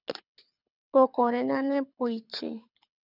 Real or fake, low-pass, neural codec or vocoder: fake; 5.4 kHz; codec, 24 kHz, 6 kbps, HILCodec